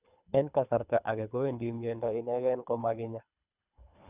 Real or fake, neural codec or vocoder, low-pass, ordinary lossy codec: fake; codec, 24 kHz, 3 kbps, HILCodec; 3.6 kHz; none